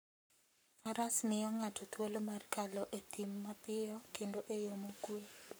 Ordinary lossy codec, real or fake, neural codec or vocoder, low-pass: none; fake; codec, 44.1 kHz, 7.8 kbps, Pupu-Codec; none